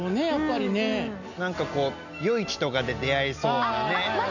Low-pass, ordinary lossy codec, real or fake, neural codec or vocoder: 7.2 kHz; none; real; none